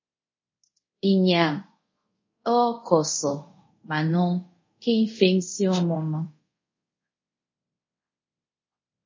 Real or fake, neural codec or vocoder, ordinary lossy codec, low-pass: fake; codec, 24 kHz, 0.5 kbps, DualCodec; MP3, 32 kbps; 7.2 kHz